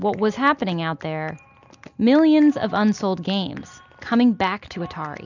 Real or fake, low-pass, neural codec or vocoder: real; 7.2 kHz; none